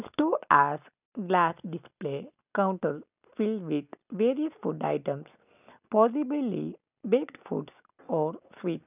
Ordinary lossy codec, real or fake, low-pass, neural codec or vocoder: none; real; 3.6 kHz; none